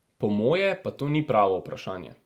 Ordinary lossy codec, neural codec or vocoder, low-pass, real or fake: Opus, 32 kbps; none; 19.8 kHz; real